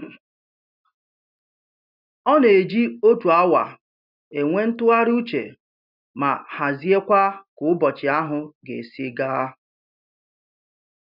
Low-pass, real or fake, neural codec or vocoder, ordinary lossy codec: 5.4 kHz; real; none; none